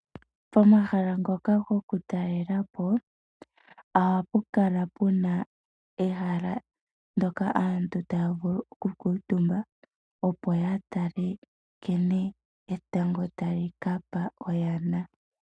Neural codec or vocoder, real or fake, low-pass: none; real; 9.9 kHz